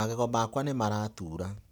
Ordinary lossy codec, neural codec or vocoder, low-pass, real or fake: none; none; none; real